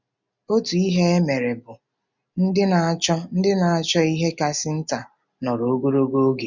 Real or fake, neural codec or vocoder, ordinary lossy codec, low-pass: real; none; none; 7.2 kHz